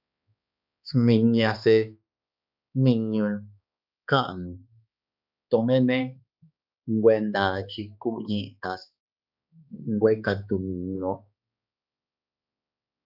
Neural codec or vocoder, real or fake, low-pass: codec, 16 kHz, 2 kbps, X-Codec, HuBERT features, trained on balanced general audio; fake; 5.4 kHz